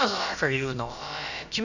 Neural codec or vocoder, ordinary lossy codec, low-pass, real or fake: codec, 16 kHz, about 1 kbps, DyCAST, with the encoder's durations; none; 7.2 kHz; fake